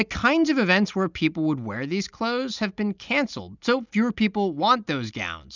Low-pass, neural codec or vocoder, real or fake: 7.2 kHz; none; real